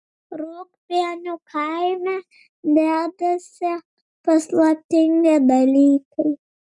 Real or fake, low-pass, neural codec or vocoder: real; 10.8 kHz; none